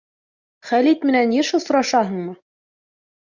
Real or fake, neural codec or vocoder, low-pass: real; none; 7.2 kHz